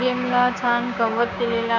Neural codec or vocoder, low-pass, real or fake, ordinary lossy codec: codec, 16 kHz, 6 kbps, DAC; 7.2 kHz; fake; none